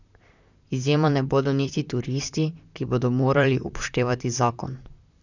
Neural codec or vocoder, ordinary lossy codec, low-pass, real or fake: vocoder, 44.1 kHz, 128 mel bands, Pupu-Vocoder; none; 7.2 kHz; fake